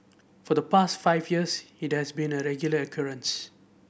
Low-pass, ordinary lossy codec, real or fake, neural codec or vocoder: none; none; real; none